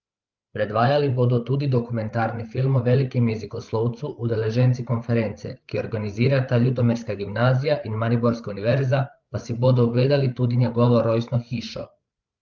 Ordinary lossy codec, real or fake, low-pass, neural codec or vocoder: Opus, 32 kbps; fake; 7.2 kHz; codec, 16 kHz, 8 kbps, FreqCodec, larger model